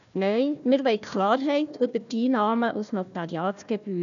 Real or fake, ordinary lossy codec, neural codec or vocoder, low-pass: fake; none; codec, 16 kHz, 1 kbps, FunCodec, trained on Chinese and English, 50 frames a second; 7.2 kHz